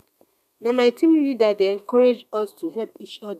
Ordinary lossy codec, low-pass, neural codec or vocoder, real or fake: none; 14.4 kHz; codec, 32 kHz, 1.9 kbps, SNAC; fake